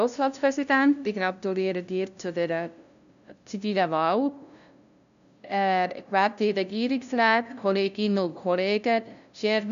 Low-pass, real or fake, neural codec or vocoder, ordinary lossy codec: 7.2 kHz; fake; codec, 16 kHz, 0.5 kbps, FunCodec, trained on LibriTTS, 25 frames a second; none